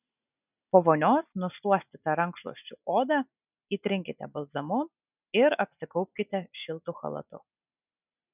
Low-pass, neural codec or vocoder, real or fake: 3.6 kHz; none; real